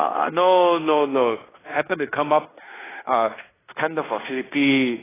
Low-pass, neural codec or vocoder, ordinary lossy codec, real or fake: 3.6 kHz; codec, 16 kHz in and 24 kHz out, 0.9 kbps, LongCat-Audio-Codec, fine tuned four codebook decoder; AAC, 16 kbps; fake